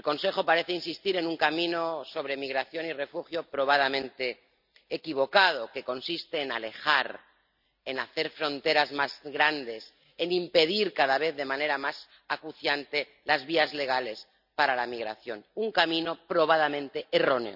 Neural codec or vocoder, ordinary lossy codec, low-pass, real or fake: none; none; 5.4 kHz; real